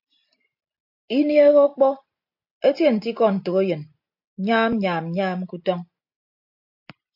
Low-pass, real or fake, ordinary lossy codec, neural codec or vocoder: 5.4 kHz; real; AAC, 48 kbps; none